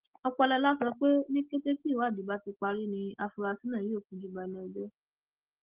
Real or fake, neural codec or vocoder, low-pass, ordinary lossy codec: real; none; 3.6 kHz; Opus, 16 kbps